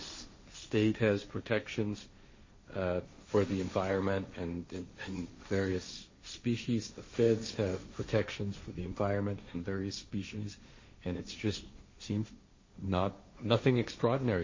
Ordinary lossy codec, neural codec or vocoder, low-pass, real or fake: MP3, 32 kbps; codec, 16 kHz, 1.1 kbps, Voila-Tokenizer; 7.2 kHz; fake